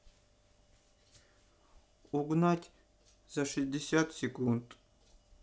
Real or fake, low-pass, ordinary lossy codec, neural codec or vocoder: real; none; none; none